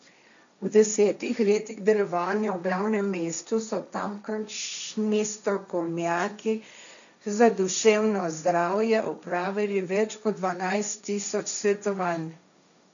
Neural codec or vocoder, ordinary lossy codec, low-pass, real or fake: codec, 16 kHz, 1.1 kbps, Voila-Tokenizer; none; 7.2 kHz; fake